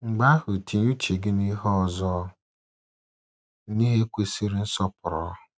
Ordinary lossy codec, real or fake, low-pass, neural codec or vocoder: none; real; none; none